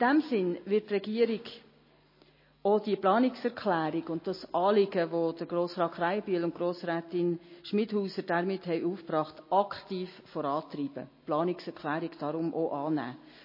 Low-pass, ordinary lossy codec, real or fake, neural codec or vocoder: 5.4 kHz; MP3, 24 kbps; real; none